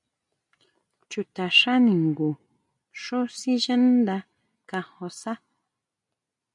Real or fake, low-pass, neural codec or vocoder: real; 10.8 kHz; none